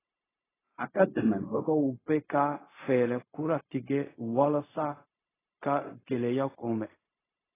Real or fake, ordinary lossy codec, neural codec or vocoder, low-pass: fake; AAC, 16 kbps; codec, 16 kHz, 0.4 kbps, LongCat-Audio-Codec; 3.6 kHz